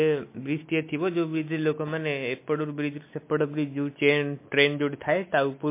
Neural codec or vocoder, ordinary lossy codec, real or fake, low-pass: none; MP3, 24 kbps; real; 3.6 kHz